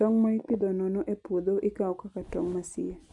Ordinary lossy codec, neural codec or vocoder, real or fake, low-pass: none; none; real; 10.8 kHz